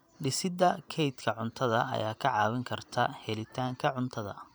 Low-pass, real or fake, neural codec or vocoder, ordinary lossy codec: none; fake; vocoder, 44.1 kHz, 128 mel bands every 512 samples, BigVGAN v2; none